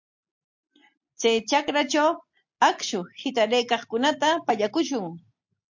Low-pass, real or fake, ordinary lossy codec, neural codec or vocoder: 7.2 kHz; real; MP3, 48 kbps; none